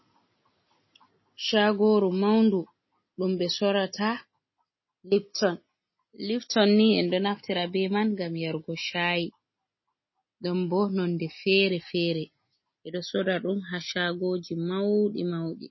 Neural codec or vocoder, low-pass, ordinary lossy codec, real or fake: none; 7.2 kHz; MP3, 24 kbps; real